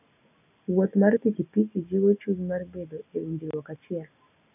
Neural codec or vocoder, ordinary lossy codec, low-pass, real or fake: none; none; 3.6 kHz; real